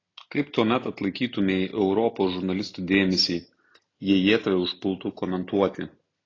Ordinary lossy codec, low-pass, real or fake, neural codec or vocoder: AAC, 32 kbps; 7.2 kHz; real; none